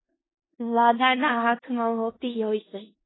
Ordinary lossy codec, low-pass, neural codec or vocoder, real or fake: AAC, 16 kbps; 7.2 kHz; codec, 16 kHz in and 24 kHz out, 0.4 kbps, LongCat-Audio-Codec, four codebook decoder; fake